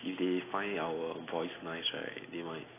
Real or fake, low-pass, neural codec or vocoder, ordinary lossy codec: real; 3.6 kHz; none; AAC, 16 kbps